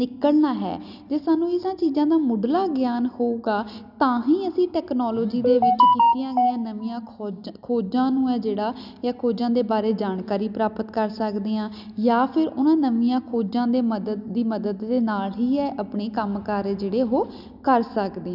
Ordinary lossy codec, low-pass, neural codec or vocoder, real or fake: none; 5.4 kHz; none; real